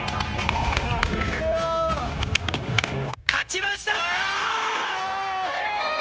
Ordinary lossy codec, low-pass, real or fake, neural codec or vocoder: none; none; fake; codec, 16 kHz, 0.9 kbps, LongCat-Audio-Codec